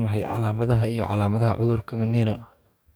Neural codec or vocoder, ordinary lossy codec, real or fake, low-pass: codec, 44.1 kHz, 2.6 kbps, DAC; none; fake; none